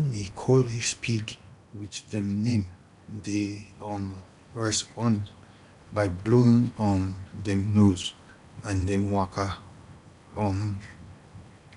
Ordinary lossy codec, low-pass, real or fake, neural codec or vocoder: none; 10.8 kHz; fake; codec, 16 kHz in and 24 kHz out, 0.8 kbps, FocalCodec, streaming, 65536 codes